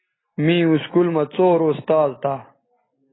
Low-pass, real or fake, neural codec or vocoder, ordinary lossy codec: 7.2 kHz; real; none; AAC, 16 kbps